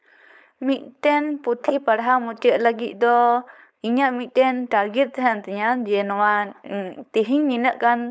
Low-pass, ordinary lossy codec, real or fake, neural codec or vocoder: none; none; fake; codec, 16 kHz, 4.8 kbps, FACodec